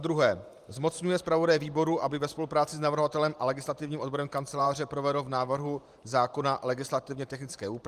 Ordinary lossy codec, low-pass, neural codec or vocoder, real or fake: Opus, 32 kbps; 14.4 kHz; vocoder, 44.1 kHz, 128 mel bands every 512 samples, BigVGAN v2; fake